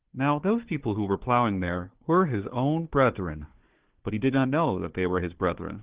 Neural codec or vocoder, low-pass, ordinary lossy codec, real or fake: codec, 16 kHz, 4 kbps, FunCodec, trained on Chinese and English, 50 frames a second; 3.6 kHz; Opus, 16 kbps; fake